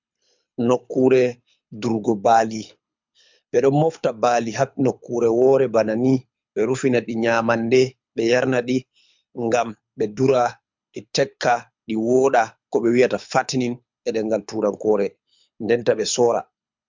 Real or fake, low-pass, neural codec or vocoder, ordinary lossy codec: fake; 7.2 kHz; codec, 24 kHz, 6 kbps, HILCodec; MP3, 64 kbps